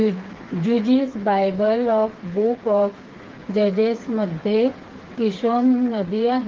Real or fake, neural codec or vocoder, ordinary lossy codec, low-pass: fake; codec, 16 kHz, 4 kbps, FreqCodec, smaller model; Opus, 16 kbps; 7.2 kHz